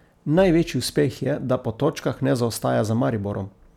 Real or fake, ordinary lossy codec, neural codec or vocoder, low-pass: real; none; none; 19.8 kHz